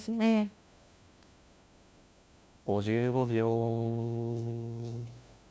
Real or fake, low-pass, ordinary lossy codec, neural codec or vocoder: fake; none; none; codec, 16 kHz, 1 kbps, FunCodec, trained on LibriTTS, 50 frames a second